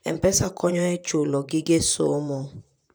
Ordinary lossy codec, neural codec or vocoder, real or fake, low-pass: none; vocoder, 44.1 kHz, 128 mel bands, Pupu-Vocoder; fake; none